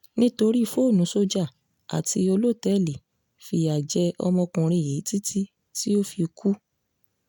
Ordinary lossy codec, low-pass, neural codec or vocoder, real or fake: none; 19.8 kHz; none; real